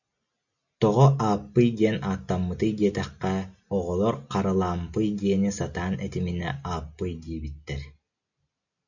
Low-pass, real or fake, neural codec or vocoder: 7.2 kHz; real; none